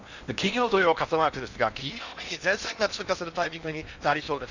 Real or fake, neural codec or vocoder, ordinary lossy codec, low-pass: fake; codec, 16 kHz in and 24 kHz out, 0.8 kbps, FocalCodec, streaming, 65536 codes; none; 7.2 kHz